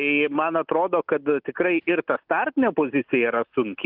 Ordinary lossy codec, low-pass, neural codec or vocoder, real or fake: Opus, 32 kbps; 5.4 kHz; none; real